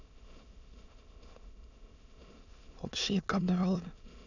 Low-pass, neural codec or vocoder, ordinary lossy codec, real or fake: 7.2 kHz; autoencoder, 22.05 kHz, a latent of 192 numbers a frame, VITS, trained on many speakers; none; fake